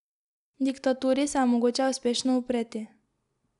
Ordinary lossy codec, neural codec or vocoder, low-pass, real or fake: none; none; 10.8 kHz; real